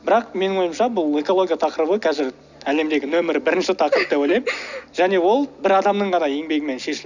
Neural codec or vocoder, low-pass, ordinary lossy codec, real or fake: none; 7.2 kHz; none; real